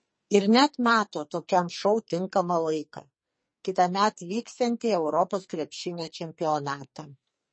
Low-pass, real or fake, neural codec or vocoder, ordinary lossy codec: 9.9 kHz; fake; codec, 44.1 kHz, 2.6 kbps, SNAC; MP3, 32 kbps